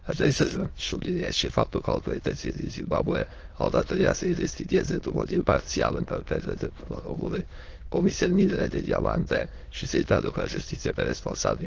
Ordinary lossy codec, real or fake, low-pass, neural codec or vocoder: Opus, 16 kbps; fake; 7.2 kHz; autoencoder, 22.05 kHz, a latent of 192 numbers a frame, VITS, trained on many speakers